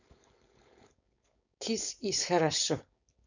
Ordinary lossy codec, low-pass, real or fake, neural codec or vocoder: none; 7.2 kHz; fake; codec, 16 kHz, 4.8 kbps, FACodec